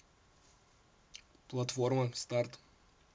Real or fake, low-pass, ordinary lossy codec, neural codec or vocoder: real; none; none; none